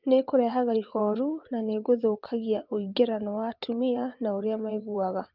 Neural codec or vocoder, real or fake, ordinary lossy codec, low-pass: vocoder, 22.05 kHz, 80 mel bands, WaveNeXt; fake; Opus, 24 kbps; 5.4 kHz